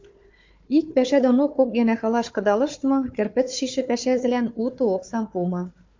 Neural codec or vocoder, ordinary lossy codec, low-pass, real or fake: codec, 16 kHz, 4 kbps, FunCodec, trained on Chinese and English, 50 frames a second; MP3, 48 kbps; 7.2 kHz; fake